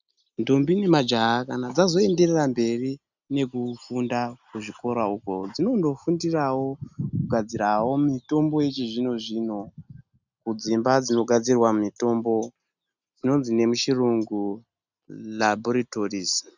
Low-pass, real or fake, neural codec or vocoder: 7.2 kHz; real; none